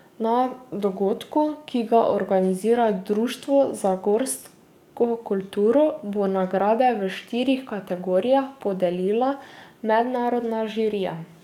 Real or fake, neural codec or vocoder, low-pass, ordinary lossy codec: fake; codec, 44.1 kHz, 7.8 kbps, DAC; 19.8 kHz; none